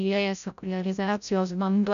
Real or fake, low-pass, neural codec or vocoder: fake; 7.2 kHz; codec, 16 kHz, 0.5 kbps, FreqCodec, larger model